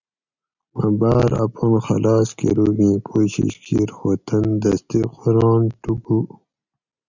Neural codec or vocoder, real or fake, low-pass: none; real; 7.2 kHz